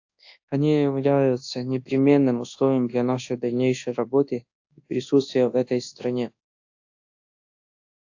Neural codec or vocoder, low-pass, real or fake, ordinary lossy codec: codec, 24 kHz, 0.9 kbps, WavTokenizer, large speech release; 7.2 kHz; fake; AAC, 48 kbps